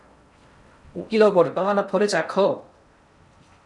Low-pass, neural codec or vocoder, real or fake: 10.8 kHz; codec, 16 kHz in and 24 kHz out, 0.6 kbps, FocalCodec, streaming, 4096 codes; fake